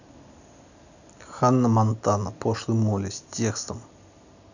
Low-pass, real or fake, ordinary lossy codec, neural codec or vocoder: 7.2 kHz; real; none; none